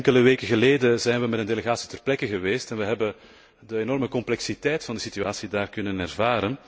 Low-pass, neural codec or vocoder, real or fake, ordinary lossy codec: none; none; real; none